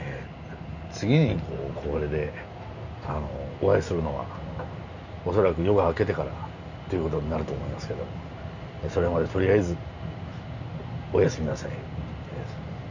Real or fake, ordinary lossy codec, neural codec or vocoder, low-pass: real; none; none; 7.2 kHz